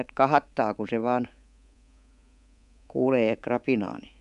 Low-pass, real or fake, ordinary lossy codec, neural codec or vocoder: 10.8 kHz; fake; none; codec, 24 kHz, 3.1 kbps, DualCodec